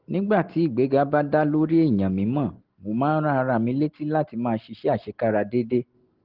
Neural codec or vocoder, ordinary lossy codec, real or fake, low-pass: none; Opus, 16 kbps; real; 5.4 kHz